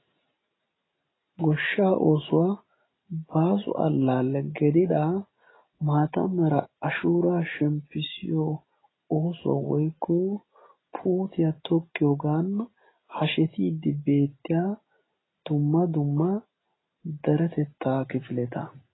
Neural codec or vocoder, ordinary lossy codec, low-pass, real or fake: none; AAC, 16 kbps; 7.2 kHz; real